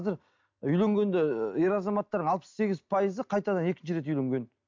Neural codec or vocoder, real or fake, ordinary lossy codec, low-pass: none; real; MP3, 64 kbps; 7.2 kHz